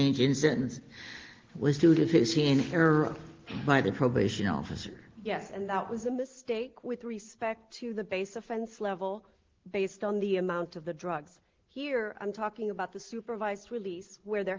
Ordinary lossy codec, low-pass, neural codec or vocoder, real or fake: Opus, 16 kbps; 7.2 kHz; none; real